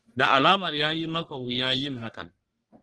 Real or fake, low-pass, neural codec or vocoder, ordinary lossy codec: fake; 10.8 kHz; codec, 44.1 kHz, 1.7 kbps, Pupu-Codec; Opus, 16 kbps